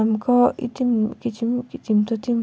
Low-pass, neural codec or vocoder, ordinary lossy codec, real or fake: none; none; none; real